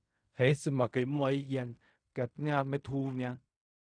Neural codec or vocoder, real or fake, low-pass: codec, 16 kHz in and 24 kHz out, 0.4 kbps, LongCat-Audio-Codec, fine tuned four codebook decoder; fake; 9.9 kHz